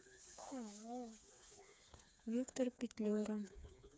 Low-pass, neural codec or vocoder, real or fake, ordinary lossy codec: none; codec, 16 kHz, 4 kbps, FreqCodec, smaller model; fake; none